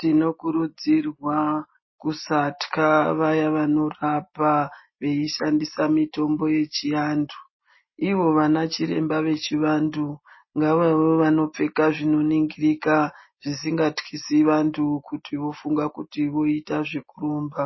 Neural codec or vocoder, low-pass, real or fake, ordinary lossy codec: none; 7.2 kHz; real; MP3, 24 kbps